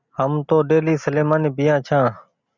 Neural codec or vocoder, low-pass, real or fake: none; 7.2 kHz; real